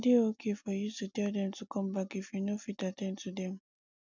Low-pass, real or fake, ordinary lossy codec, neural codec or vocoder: 7.2 kHz; real; none; none